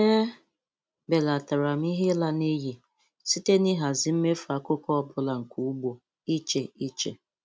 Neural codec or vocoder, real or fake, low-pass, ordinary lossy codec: none; real; none; none